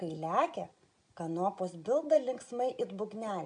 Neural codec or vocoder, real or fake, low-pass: none; real; 9.9 kHz